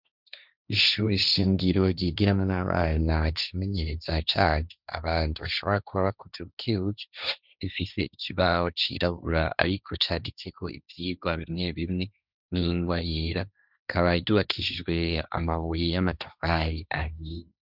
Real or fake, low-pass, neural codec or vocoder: fake; 5.4 kHz; codec, 16 kHz, 1.1 kbps, Voila-Tokenizer